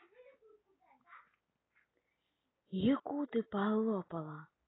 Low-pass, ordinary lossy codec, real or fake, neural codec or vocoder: 7.2 kHz; AAC, 16 kbps; real; none